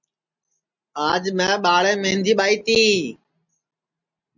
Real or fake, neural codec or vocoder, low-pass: real; none; 7.2 kHz